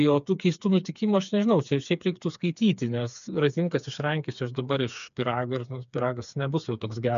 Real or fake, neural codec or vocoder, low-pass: fake; codec, 16 kHz, 4 kbps, FreqCodec, smaller model; 7.2 kHz